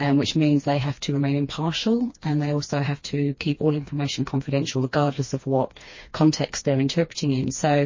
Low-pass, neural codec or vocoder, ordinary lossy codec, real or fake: 7.2 kHz; codec, 16 kHz, 2 kbps, FreqCodec, smaller model; MP3, 32 kbps; fake